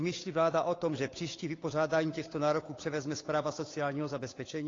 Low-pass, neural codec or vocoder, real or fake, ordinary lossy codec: 7.2 kHz; codec, 16 kHz, 8 kbps, FunCodec, trained on Chinese and English, 25 frames a second; fake; AAC, 32 kbps